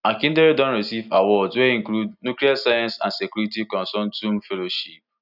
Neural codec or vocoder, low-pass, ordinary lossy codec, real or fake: none; 5.4 kHz; none; real